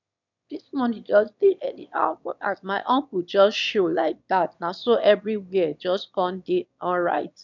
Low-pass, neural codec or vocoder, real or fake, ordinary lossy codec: 7.2 kHz; autoencoder, 22.05 kHz, a latent of 192 numbers a frame, VITS, trained on one speaker; fake; none